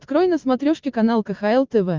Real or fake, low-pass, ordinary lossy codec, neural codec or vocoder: real; 7.2 kHz; Opus, 24 kbps; none